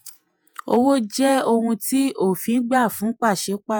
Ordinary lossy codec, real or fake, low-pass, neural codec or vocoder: none; fake; none; vocoder, 48 kHz, 128 mel bands, Vocos